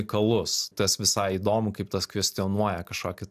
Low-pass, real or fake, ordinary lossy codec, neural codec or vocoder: 14.4 kHz; real; AAC, 96 kbps; none